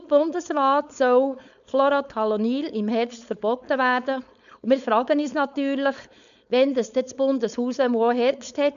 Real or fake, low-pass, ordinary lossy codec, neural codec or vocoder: fake; 7.2 kHz; none; codec, 16 kHz, 4.8 kbps, FACodec